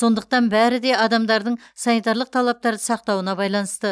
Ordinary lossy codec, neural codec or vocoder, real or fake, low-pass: none; none; real; none